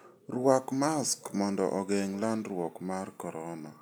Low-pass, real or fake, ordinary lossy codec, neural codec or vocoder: none; real; none; none